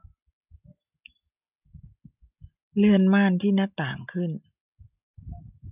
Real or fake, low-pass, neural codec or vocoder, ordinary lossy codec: real; 3.6 kHz; none; none